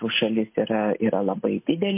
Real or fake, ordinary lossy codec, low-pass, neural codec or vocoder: real; MP3, 24 kbps; 3.6 kHz; none